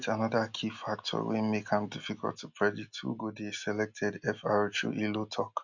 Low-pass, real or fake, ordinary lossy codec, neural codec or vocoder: 7.2 kHz; real; none; none